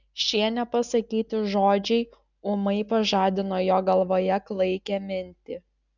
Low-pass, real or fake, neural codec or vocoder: 7.2 kHz; real; none